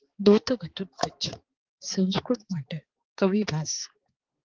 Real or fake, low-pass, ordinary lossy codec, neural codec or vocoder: fake; 7.2 kHz; Opus, 16 kbps; codec, 16 kHz, 4 kbps, X-Codec, HuBERT features, trained on balanced general audio